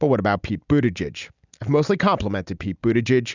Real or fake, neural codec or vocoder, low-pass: real; none; 7.2 kHz